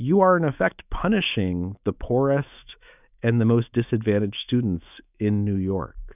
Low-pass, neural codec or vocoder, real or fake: 3.6 kHz; autoencoder, 48 kHz, 128 numbers a frame, DAC-VAE, trained on Japanese speech; fake